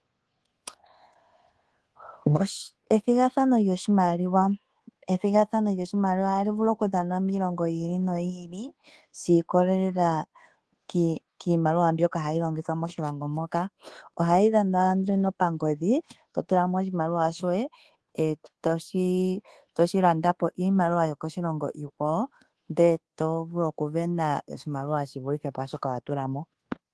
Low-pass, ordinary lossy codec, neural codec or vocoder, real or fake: 10.8 kHz; Opus, 16 kbps; codec, 24 kHz, 1.2 kbps, DualCodec; fake